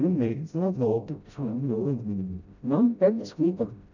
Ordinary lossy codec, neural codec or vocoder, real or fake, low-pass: none; codec, 16 kHz, 0.5 kbps, FreqCodec, smaller model; fake; 7.2 kHz